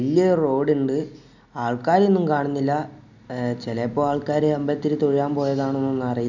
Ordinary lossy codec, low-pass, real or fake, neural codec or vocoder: none; 7.2 kHz; real; none